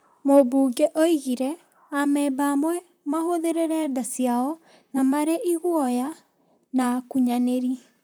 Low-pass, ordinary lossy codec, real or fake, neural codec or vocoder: none; none; fake; vocoder, 44.1 kHz, 128 mel bands, Pupu-Vocoder